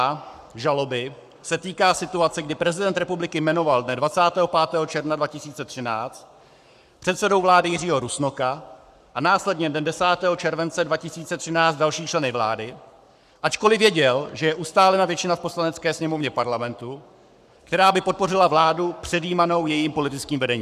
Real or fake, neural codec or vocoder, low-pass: fake; codec, 44.1 kHz, 7.8 kbps, Pupu-Codec; 14.4 kHz